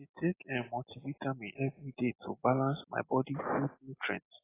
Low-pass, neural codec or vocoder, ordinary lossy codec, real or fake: 3.6 kHz; none; AAC, 16 kbps; real